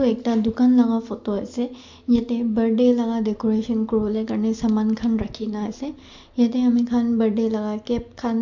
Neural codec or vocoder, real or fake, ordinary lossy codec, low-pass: none; real; MP3, 48 kbps; 7.2 kHz